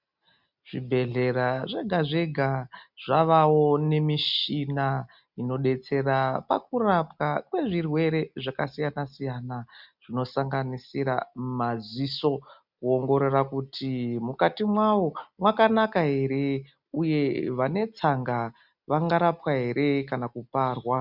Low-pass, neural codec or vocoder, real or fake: 5.4 kHz; none; real